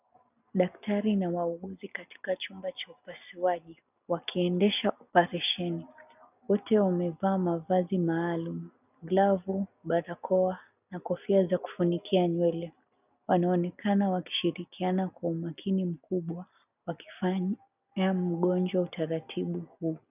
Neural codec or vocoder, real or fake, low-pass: none; real; 3.6 kHz